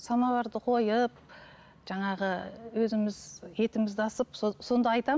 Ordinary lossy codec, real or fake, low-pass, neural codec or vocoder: none; real; none; none